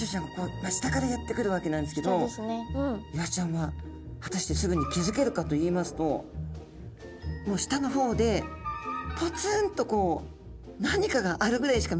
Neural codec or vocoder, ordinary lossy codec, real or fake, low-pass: none; none; real; none